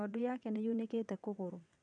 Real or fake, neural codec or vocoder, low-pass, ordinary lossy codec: fake; vocoder, 22.05 kHz, 80 mel bands, WaveNeXt; 9.9 kHz; none